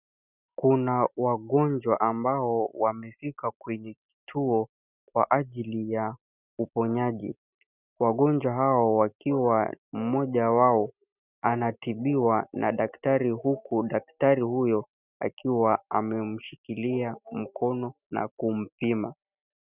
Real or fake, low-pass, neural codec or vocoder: real; 3.6 kHz; none